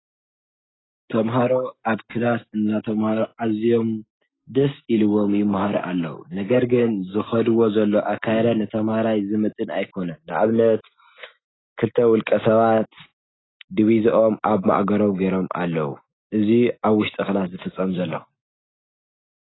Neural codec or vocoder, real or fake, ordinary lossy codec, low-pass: none; real; AAC, 16 kbps; 7.2 kHz